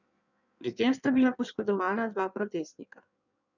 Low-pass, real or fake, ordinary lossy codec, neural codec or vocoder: 7.2 kHz; fake; none; codec, 16 kHz in and 24 kHz out, 1.1 kbps, FireRedTTS-2 codec